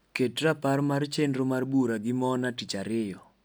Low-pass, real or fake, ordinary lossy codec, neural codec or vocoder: none; real; none; none